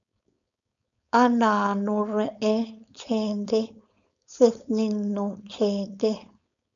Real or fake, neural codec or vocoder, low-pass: fake; codec, 16 kHz, 4.8 kbps, FACodec; 7.2 kHz